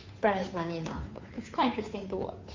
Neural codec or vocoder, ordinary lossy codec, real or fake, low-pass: codec, 16 kHz, 2 kbps, FunCodec, trained on Chinese and English, 25 frames a second; MP3, 48 kbps; fake; 7.2 kHz